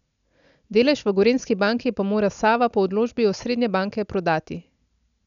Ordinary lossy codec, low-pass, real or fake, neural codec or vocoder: none; 7.2 kHz; real; none